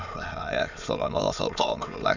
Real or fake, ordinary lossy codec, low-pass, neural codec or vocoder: fake; none; 7.2 kHz; autoencoder, 22.05 kHz, a latent of 192 numbers a frame, VITS, trained on many speakers